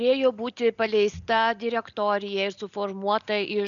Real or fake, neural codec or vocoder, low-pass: real; none; 7.2 kHz